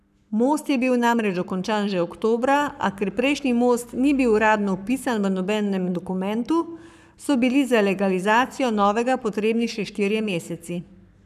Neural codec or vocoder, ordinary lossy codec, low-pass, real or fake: codec, 44.1 kHz, 7.8 kbps, Pupu-Codec; none; 14.4 kHz; fake